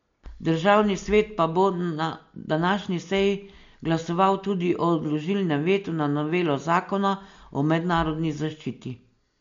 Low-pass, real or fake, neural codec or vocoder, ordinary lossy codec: 7.2 kHz; real; none; MP3, 48 kbps